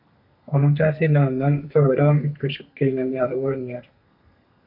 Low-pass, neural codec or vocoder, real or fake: 5.4 kHz; codec, 32 kHz, 1.9 kbps, SNAC; fake